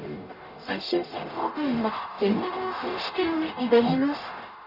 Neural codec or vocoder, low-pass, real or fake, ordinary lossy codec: codec, 44.1 kHz, 0.9 kbps, DAC; 5.4 kHz; fake; none